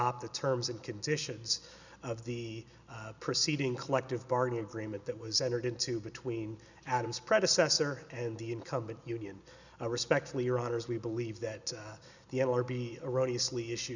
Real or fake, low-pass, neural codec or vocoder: real; 7.2 kHz; none